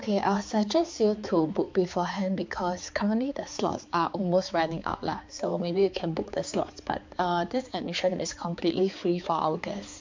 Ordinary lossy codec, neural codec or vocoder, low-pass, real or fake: MP3, 64 kbps; codec, 16 kHz, 4 kbps, X-Codec, HuBERT features, trained on balanced general audio; 7.2 kHz; fake